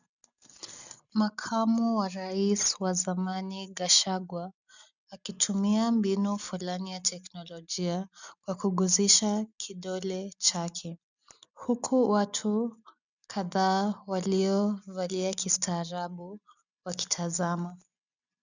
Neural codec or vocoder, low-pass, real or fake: none; 7.2 kHz; real